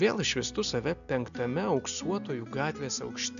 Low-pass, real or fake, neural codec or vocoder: 7.2 kHz; real; none